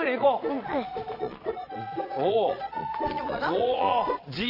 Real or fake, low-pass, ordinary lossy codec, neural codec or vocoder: fake; 5.4 kHz; AAC, 32 kbps; vocoder, 22.05 kHz, 80 mel bands, Vocos